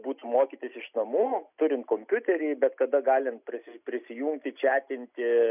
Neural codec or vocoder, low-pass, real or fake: none; 3.6 kHz; real